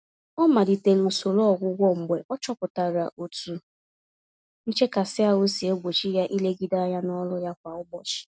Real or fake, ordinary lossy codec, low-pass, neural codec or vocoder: real; none; none; none